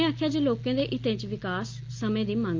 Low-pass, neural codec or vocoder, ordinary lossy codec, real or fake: 7.2 kHz; none; Opus, 32 kbps; real